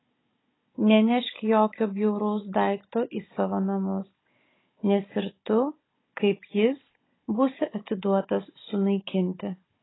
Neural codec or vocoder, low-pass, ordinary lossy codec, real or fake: codec, 16 kHz, 16 kbps, FunCodec, trained on Chinese and English, 50 frames a second; 7.2 kHz; AAC, 16 kbps; fake